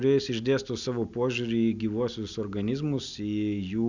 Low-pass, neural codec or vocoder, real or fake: 7.2 kHz; none; real